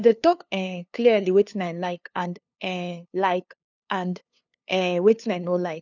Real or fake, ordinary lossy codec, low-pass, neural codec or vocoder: fake; none; 7.2 kHz; codec, 16 kHz, 2 kbps, FunCodec, trained on LibriTTS, 25 frames a second